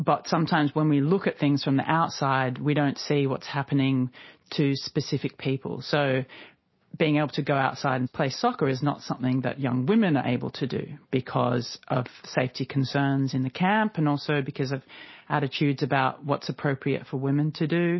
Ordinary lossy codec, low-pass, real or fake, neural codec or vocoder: MP3, 24 kbps; 7.2 kHz; real; none